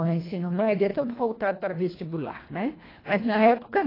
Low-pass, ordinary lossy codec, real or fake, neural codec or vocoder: 5.4 kHz; AAC, 24 kbps; fake; codec, 24 kHz, 1.5 kbps, HILCodec